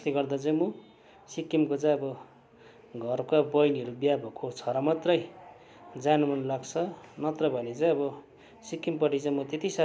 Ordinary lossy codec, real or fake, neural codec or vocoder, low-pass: none; real; none; none